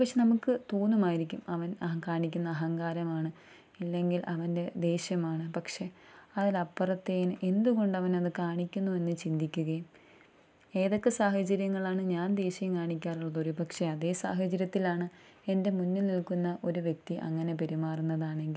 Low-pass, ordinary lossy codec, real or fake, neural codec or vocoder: none; none; real; none